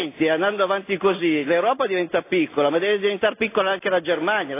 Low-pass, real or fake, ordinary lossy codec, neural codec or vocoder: 3.6 kHz; real; AAC, 24 kbps; none